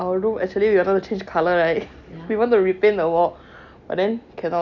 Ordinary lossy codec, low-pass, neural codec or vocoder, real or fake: none; 7.2 kHz; none; real